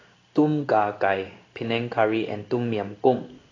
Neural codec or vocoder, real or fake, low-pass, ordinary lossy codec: codec, 16 kHz in and 24 kHz out, 1 kbps, XY-Tokenizer; fake; 7.2 kHz; AAC, 32 kbps